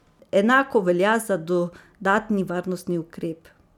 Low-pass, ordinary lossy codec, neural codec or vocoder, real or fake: 19.8 kHz; none; none; real